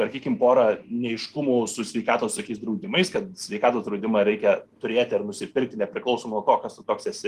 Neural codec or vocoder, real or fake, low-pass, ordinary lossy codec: none; real; 14.4 kHz; Opus, 24 kbps